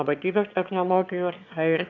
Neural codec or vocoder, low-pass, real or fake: autoencoder, 22.05 kHz, a latent of 192 numbers a frame, VITS, trained on one speaker; 7.2 kHz; fake